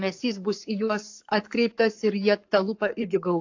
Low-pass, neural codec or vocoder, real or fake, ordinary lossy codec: 7.2 kHz; codec, 44.1 kHz, 7.8 kbps, Pupu-Codec; fake; AAC, 48 kbps